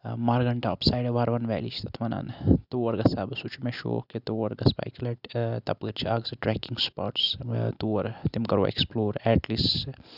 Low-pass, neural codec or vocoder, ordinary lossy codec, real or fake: 5.4 kHz; none; none; real